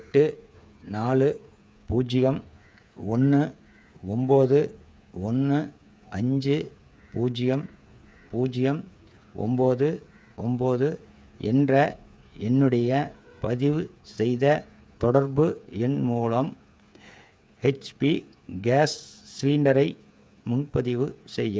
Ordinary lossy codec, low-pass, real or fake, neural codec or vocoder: none; none; fake; codec, 16 kHz, 8 kbps, FreqCodec, smaller model